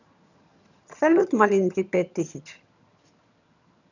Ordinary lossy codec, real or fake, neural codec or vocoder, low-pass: none; fake; vocoder, 22.05 kHz, 80 mel bands, HiFi-GAN; 7.2 kHz